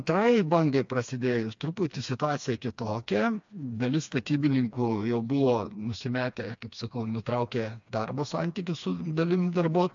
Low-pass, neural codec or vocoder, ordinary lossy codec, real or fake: 7.2 kHz; codec, 16 kHz, 2 kbps, FreqCodec, smaller model; AAC, 64 kbps; fake